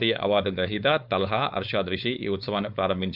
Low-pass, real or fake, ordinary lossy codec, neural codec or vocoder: 5.4 kHz; fake; none; codec, 16 kHz, 4.8 kbps, FACodec